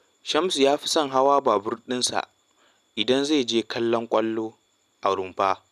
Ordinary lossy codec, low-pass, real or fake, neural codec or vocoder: none; 14.4 kHz; real; none